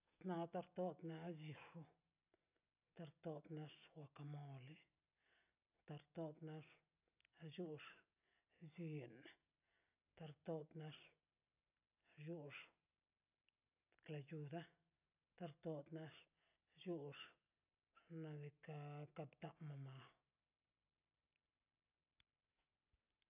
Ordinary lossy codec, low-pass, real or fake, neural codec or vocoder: none; 3.6 kHz; real; none